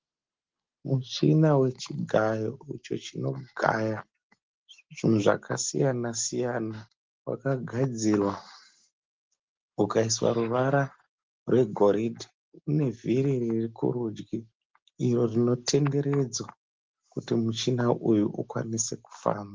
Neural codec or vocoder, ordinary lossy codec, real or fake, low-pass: none; Opus, 16 kbps; real; 7.2 kHz